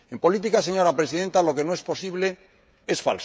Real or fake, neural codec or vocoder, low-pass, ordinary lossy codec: fake; codec, 16 kHz, 16 kbps, FreqCodec, smaller model; none; none